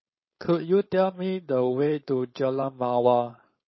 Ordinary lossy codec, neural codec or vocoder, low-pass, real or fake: MP3, 24 kbps; codec, 16 kHz, 4.8 kbps, FACodec; 7.2 kHz; fake